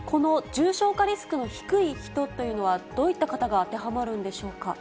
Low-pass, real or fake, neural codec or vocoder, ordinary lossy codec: none; real; none; none